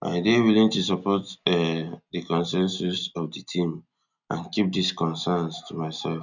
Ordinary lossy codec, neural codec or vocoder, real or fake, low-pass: none; none; real; 7.2 kHz